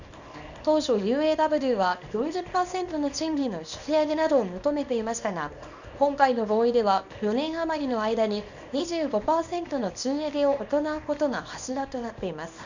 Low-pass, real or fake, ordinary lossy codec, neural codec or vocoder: 7.2 kHz; fake; none; codec, 24 kHz, 0.9 kbps, WavTokenizer, small release